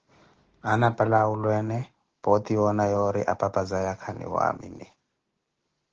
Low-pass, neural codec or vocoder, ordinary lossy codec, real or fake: 7.2 kHz; none; Opus, 16 kbps; real